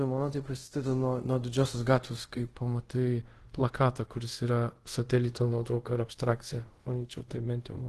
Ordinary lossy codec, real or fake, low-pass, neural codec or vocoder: Opus, 16 kbps; fake; 10.8 kHz; codec, 24 kHz, 0.5 kbps, DualCodec